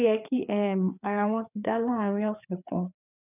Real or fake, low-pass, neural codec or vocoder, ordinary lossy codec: fake; 3.6 kHz; codec, 16 kHz, 4 kbps, FreqCodec, larger model; none